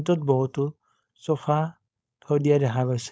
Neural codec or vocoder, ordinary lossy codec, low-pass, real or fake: codec, 16 kHz, 4.8 kbps, FACodec; none; none; fake